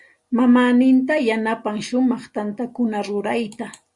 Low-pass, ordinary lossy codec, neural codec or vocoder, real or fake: 10.8 kHz; Opus, 64 kbps; none; real